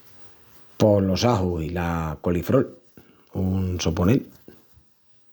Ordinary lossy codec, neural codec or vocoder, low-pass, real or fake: none; none; none; real